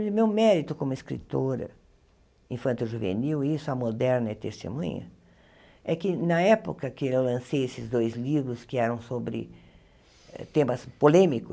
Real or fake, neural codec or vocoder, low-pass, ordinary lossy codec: real; none; none; none